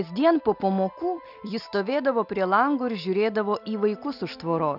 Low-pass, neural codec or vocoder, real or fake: 5.4 kHz; none; real